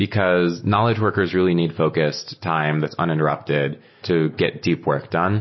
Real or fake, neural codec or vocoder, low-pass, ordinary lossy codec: real; none; 7.2 kHz; MP3, 24 kbps